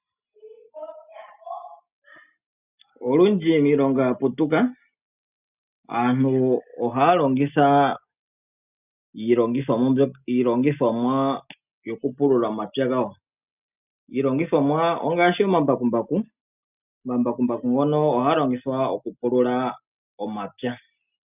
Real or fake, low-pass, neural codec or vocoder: real; 3.6 kHz; none